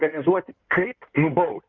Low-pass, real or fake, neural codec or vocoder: 7.2 kHz; fake; codec, 16 kHz in and 24 kHz out, 2.2 kbps, FireRedTTS-2 codec